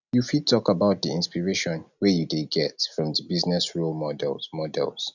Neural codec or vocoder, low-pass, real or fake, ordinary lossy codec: none; 7.2 kHz; real; none